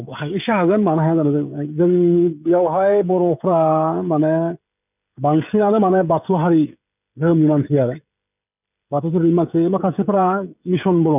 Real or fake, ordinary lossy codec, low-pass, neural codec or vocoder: fake; none; 3.6 kHz; codec, 16 kHz, 16 kbps, FreqCodec, smaller model